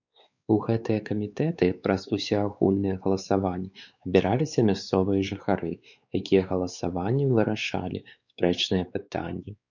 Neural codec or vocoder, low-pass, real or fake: codec, 16 kHz, 4 kbps, X-Codec, WavLM features, trained on Multilingual LibriSpeech; 7.2 kHz; fake